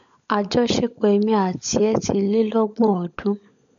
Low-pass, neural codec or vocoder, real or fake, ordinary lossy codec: 7.2 kHz; codec, 16 kHz, 16 kbps, FunCodec, trained on LibriTTS, 50 frames a second; fake; none